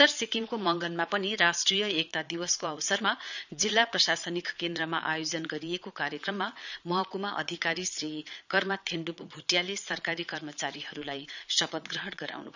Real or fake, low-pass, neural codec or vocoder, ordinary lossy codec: fake; 7.2 kHz; vocoder, 22.05 kHz, 80 mel bands, Vocos; none